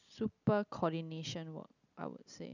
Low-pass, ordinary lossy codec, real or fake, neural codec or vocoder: 7.2 kHz; none; real; none